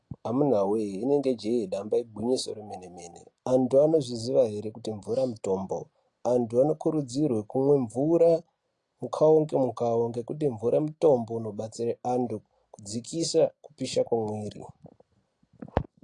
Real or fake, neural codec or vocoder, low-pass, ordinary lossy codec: real; none; 10.8 kHz; AAC, 48 kbps